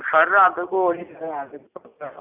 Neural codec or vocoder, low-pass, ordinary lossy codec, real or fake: none; 3.6 kHz; none; real